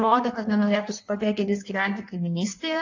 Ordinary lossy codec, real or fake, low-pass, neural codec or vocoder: AAC, 48 kbps; fake; 7.2 kHz; codec, 16 kHz in and 24 kHz out, 1.1 kbps, FireRedTTS-2 codec